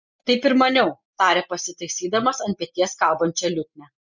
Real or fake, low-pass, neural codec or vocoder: real; 7.2 kHz; none